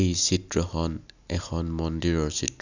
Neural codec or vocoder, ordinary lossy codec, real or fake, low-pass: none; none; real; 7.2 kHz